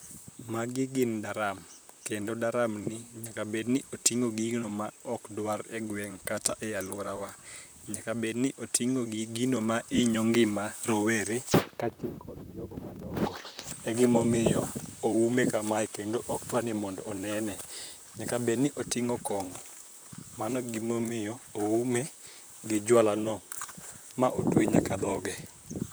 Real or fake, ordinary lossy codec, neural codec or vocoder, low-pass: fake; none; vocoder, 44.1 kHz, 128 mel bands, Pupu-Vocoder; none